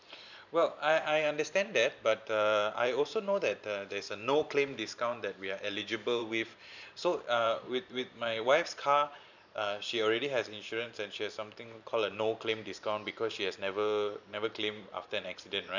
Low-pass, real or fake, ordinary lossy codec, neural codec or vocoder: 7.2 kHz; fake; none; vocoder, 44.1 kHz, 128 mel bands every 512 samples, BigVGAN v2